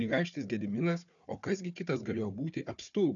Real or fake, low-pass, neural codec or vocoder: fake; 7.2 kHz; codec, 16 kHz, 4 kbps, FunCodec, trained on Chinese and English, 50 frames a second